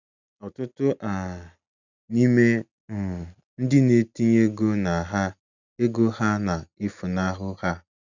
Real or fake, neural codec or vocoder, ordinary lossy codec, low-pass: real; none; none; 7.2 kHz